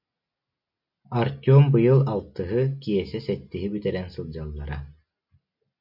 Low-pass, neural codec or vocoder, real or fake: 5.4 kHz; none; real